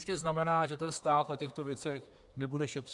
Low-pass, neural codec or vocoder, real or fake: 10.8 kHz; codec, 24 kHz, 1 kbps, SNAC; fake